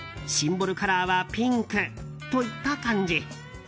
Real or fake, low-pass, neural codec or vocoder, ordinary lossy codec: real; none; none; none